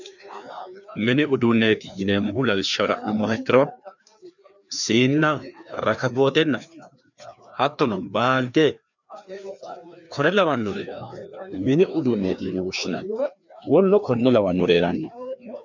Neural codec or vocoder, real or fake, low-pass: codec, 16 kHz, 2 kbps, FreqCodec, larger model; fake; 7.2 kHz